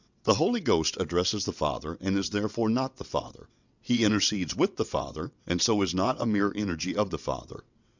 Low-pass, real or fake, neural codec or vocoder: 7.2 kHz; fake; vocoder, 22.05 kHz, 80 mel bands, WaveNeXt